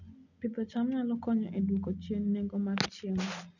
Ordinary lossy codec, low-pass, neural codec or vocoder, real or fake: none; 7.2 kHz; none; real